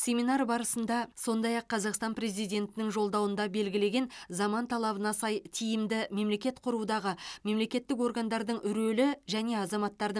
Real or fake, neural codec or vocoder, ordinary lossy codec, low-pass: real; none; none; none